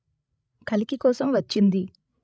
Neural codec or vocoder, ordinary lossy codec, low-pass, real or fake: codec, 16 kHz, 16 kbps, FreqCodec, larger model; none; none; fake